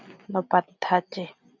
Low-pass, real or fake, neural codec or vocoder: 7.2 kHz; real; none